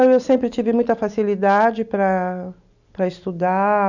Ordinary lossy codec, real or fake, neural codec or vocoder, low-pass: none; real; none; 7.2 kHz